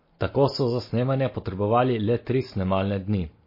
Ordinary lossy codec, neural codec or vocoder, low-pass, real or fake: MP3, 24 kbps; none; 5.4 kHz; real